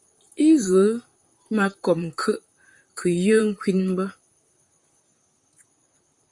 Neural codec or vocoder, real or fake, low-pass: vocoder, 44.1 kHz, 128 mel bands, Pupu-Vocoder; fake; 10.8 kHz